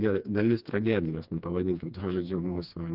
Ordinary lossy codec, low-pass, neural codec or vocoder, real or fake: Opus, 24 kbps; 5.4 kHz; codec, 16 kHz, 2 kbps, FreqCodec, smaller model; fake